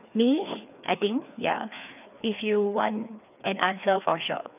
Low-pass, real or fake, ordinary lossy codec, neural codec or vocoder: 3.6 kHz; fake; none; codec, 16 kHz, 2 kbps, FreqCodec, larger model